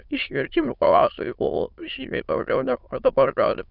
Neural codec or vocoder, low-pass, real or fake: autoencoder, 22.05 kHz, a latent of 192 numbers a frame, VITS, trained on many speakers; 5.4 kHz; fake